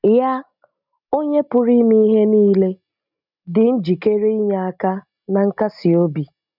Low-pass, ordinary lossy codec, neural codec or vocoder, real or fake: 5.4 kHz; none; none; real